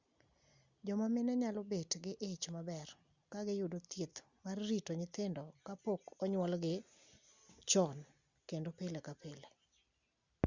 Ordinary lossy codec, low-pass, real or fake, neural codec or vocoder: Opus, 64 kbps; 7.2 kHz; real; none